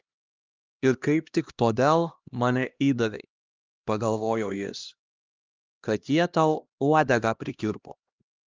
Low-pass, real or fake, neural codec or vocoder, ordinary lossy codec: 7.2 kHz; fake; codec, 16 kHz, 1 kbps, X-Codec, HuBERT features, trained on LibriSpeech; Opus, 32 kbps